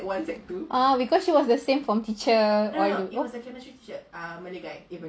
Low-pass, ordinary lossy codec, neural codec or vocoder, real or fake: none; none; none; real